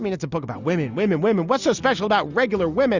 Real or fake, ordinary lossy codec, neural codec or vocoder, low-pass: real; Opus, 64 kbps; none; 7.2 kHz